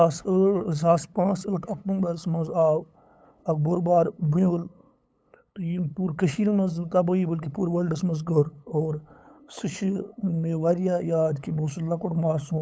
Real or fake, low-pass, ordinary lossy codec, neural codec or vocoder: fake; none; none; codec, 16 kHz, 8 kbps, FunCodec, trained on LibriTTS, 25 frames a second